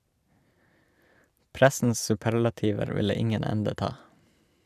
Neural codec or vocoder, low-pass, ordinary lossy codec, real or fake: none; 14.4 kHz; none; real